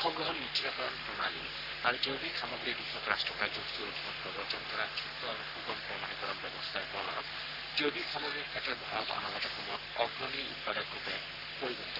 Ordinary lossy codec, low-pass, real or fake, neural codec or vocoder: none; 5.4 kHz; fake; codec, 44.1 kHz, 3.4 kbps, Pupu-Codec